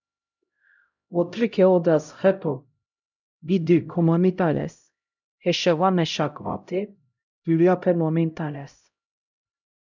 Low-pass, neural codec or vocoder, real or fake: 7.2 kHz; codec, 16 kHz, 0.5 kbps, X-Codec, HuBERT features, trained on LibriSpeech; fake